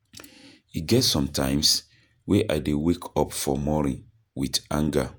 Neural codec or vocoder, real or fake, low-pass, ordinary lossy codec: vocoder, 48 kHz, 128 mel bands, Vocos; fake; none; none